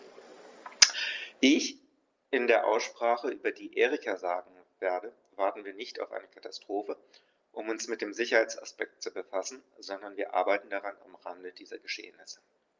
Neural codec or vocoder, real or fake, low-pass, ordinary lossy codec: none; real; 7.2 kHz; Opus, 32 kbps